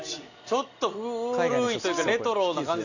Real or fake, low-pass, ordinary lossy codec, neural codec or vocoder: real; 7.2 kHz; none; none